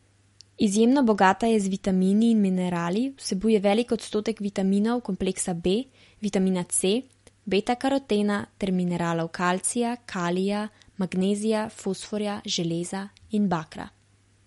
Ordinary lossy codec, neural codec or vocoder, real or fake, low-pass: MP3, 48 kbps; none; real; 14.4 kHz